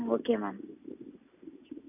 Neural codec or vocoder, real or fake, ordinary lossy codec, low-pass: none; real; AAC, 32 kbps; 3.6 kHz